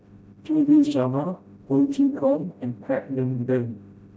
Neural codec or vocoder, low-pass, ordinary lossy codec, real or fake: codec, 16 kHz, 0.5 kbps, FreqCodec, smaller model; none; none; fake